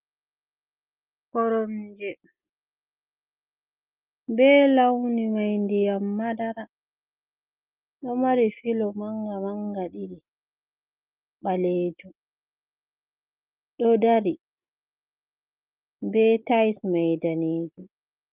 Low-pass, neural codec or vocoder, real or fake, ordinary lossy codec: 3.6 kHz; none; real; Opus, 32 kbps